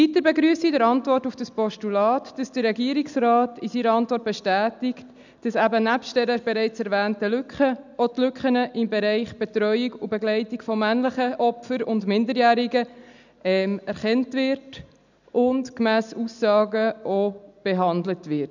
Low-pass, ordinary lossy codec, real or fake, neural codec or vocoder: 7.2 kHz; none; real; none